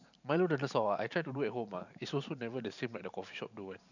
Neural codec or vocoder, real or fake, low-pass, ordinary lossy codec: vocoder, 44.1 kHz, 128 mel bands every 512 samples, BigVGAN v2; fake; 7.2 kHz; none